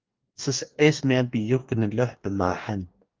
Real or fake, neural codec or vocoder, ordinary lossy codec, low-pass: fake; codec, 44.1 kHz, 2.6 kbps, DAC; Opus, 24 kbps; 7.2 kHz